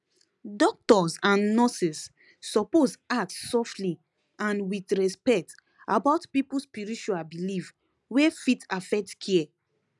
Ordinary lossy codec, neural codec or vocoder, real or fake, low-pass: none; none; real; none